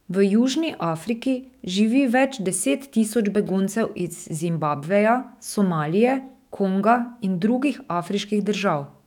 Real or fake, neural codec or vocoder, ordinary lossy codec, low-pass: fake; autoencoder, 48 kHz, 128 numbers a frame, DAC-VAE, trained on Japanese speech; none; 19.8 kHz